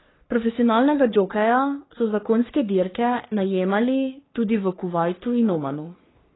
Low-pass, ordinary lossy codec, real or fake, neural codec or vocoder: 7.2 kHz; AAC, 16 kbps; fake; codec, 44.1 kHz, 3.4 kbps, Pupu-Codec